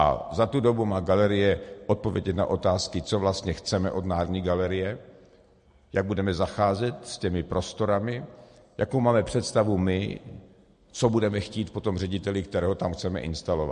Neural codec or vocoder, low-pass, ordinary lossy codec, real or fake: none; 9.9 kHz; MP3, 48 kbps; real